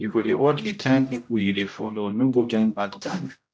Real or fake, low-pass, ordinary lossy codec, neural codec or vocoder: fake; none; none; codec, 16 kHz, 0.5 kbps, X-Codec, HuBERT features, trained on general audio